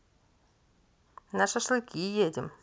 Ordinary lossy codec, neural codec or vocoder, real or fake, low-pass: none; none; real; none